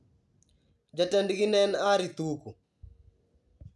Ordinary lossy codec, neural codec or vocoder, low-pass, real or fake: none; none; none; real